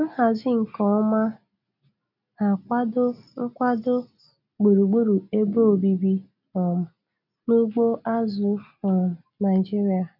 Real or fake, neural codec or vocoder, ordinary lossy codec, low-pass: real; none; none; 5.4 kHz